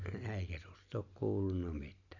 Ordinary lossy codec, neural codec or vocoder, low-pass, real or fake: none; none; 7.2 kHz; real